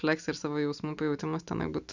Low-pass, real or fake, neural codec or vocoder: 7.2 kHz; real; none